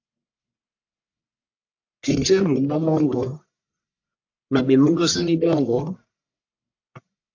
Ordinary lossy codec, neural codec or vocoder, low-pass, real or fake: AAC, 48 kbps; codec, 44.1 kHz, 1.7 kbps, Pupu-Codec; 7.2 kHz; fake